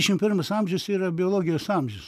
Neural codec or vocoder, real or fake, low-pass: none; real; 14.4 kHz